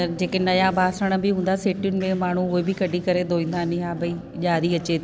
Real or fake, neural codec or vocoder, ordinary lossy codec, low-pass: real; none; none; none